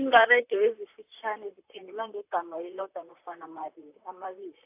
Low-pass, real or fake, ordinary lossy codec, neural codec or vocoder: 3.6 kHz; fake; AAC, 32 kbps; vocoder, 44.1 kHz, 128 mel bands, Pupu-Vocoder